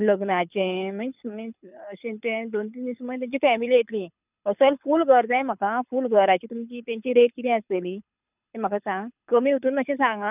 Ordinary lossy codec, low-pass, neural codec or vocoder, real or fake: none; 3.6 kHz; codec, 24 kHz, 6 kbps, HILCodec; fake